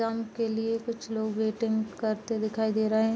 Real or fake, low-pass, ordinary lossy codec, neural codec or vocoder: real; none; none; none